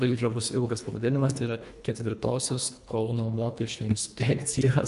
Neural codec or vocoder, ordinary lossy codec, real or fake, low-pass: codec, 24 kHz, 1.5 kbps, HILCodec; AAC, 96 kbps; fake; 10.8 kHz